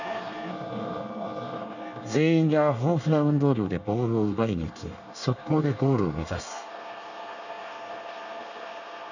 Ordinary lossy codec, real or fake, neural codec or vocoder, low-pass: none; fake; codec, 24 kHz, 1 kbps, SNAC; 7.2 kHz